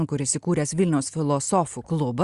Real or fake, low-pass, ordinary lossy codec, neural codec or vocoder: real; 10.8 kHz; Opus, 64 kbps; none